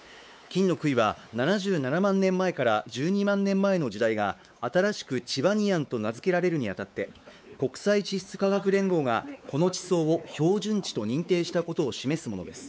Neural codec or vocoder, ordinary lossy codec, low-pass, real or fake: codec, 16 kHz, 4 kbps, X-Codec, WavLM features, trained on Multilingual LibriSpeech; none; none; fake